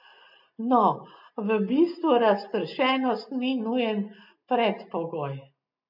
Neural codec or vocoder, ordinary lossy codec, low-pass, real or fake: none; MP3, 48 kbps; 5.4 kHz; real